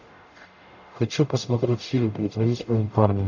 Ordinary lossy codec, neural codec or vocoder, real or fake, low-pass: AAC, 48 kbps; codec, 44.1 kHz, 0.9 kbps, DAC; fake; 7.2 kHz